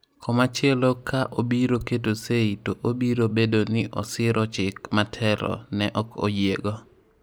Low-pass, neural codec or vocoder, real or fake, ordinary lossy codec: none; none; real; none